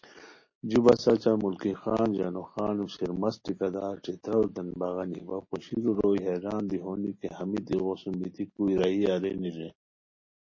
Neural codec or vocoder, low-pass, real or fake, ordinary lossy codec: none; 7.2 kHz; real; MP3, 32 kbps